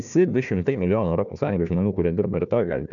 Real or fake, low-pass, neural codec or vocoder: fake; 7.2 kHz; codec, 16 kHz, 1 kbps, FunCodec, trained on Chinese and English, 50 frames a second